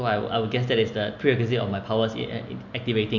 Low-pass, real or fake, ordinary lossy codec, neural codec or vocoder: 7.2 kHz; real; MP3, 48 kbps; none